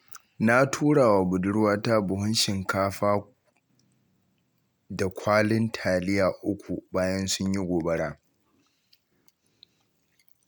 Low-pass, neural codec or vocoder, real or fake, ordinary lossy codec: none; none; real; none